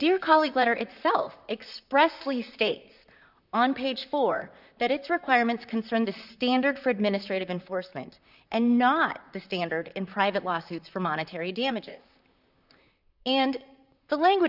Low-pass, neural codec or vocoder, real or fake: 5.4 kHz; vocoder, 44.1 kHz, 128 mel bands, Pupu-Vocoder; fake